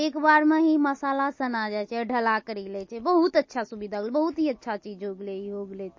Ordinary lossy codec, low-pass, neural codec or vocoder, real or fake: MP3, 32 kbps; 7.2 kHz; none; real